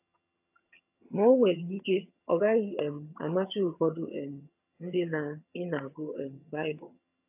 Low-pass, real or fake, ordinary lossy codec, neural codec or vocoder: 3.6 kHz; fake; AAC, 32 kbps; vocoder, 22.05 kHz, 80 mel bands, HiFi-GAN